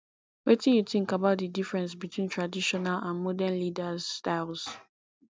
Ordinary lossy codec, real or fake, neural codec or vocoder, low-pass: none; real; none; none